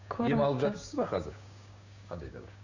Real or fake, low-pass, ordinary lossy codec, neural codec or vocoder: fake; 7.2 kHz; none; codec, 16 kHz, 6 kbps, DAC